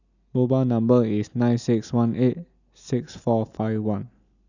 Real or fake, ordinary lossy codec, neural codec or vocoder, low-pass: real; none; none; 7.2 kHz